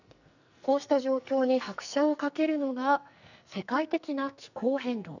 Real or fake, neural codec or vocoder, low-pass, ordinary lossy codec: fake; codec, 44.1 kHz, 2.6 kbps, SNAC; 7.2 kHz; none